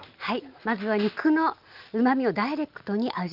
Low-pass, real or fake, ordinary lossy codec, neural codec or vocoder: 5.4 kHz; real; Opus, 24 kbps; none